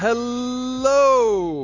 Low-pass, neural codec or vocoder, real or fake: 7.2 kHz; none; real